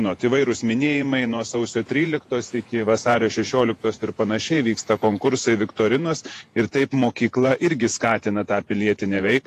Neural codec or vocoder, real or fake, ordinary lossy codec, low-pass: vocoder, 48 kHz, 128 mel bands, Vocos; fake; AAC, 48 kbps; 14.4 kHz